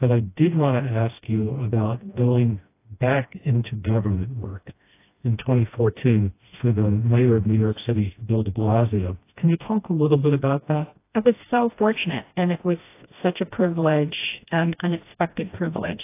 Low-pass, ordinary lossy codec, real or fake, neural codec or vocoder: 3.6 kHz; AAC, 24 kbps; fake; codec, 16 kHz, 1 kbps, FreqCodec, smaller model